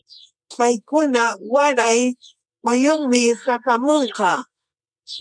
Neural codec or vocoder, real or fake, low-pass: codec, 24 kHz, 0.9 kbps, WavTokenizer, medium music audio release; fake; 9.9 kHz